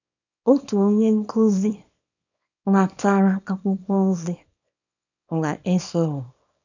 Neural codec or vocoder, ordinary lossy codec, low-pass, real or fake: codec, 24 kHz, 0.9 kbps, WavTokenizer, small release; none; 7.2 kHz; fake